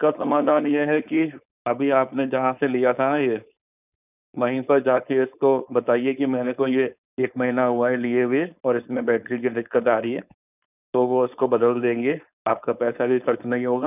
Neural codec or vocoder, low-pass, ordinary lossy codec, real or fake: codec, 16 kHz, 4.8 kbps, FACodec; 3.6 kHz; none; fake